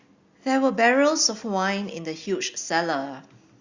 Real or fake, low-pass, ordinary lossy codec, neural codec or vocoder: real; 7.2 kHz; Opus, 64 kbps; none